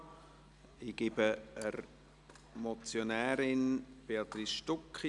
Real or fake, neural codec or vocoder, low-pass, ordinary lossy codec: real; none; 10.8 kHz; none